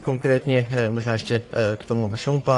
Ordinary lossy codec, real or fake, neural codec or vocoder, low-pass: AAC, 48 kbps; fake; codec, 44.1 kHz, 1.7 kbps, Pupu-Codec; 10.8 kHz